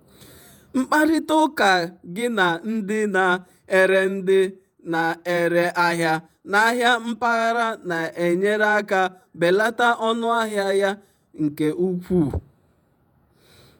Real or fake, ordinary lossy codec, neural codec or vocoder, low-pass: fake; none; vocoder, 48 kHz, 128 mel bands, Vocos; none